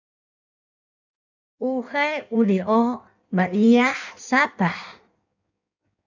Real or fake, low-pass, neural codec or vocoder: fake; 7.2 kHz; codec, 16 kHz in and 24 kHz out, 1.1 kbps, FireRedTTS-2 codec